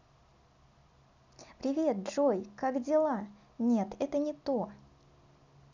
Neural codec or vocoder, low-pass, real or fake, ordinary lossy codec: none; 7.2 kHz; real; none